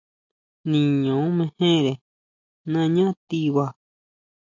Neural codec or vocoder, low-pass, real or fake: none; 7.2 kHz; real